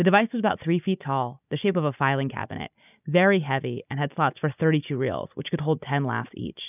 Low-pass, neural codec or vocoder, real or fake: 3.6 kHz; none; real